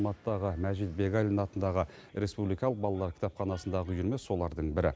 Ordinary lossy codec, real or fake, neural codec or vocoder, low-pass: none; real; none; none